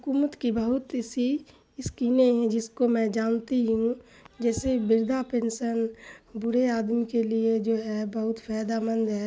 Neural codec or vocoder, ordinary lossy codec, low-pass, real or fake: none; none; none; real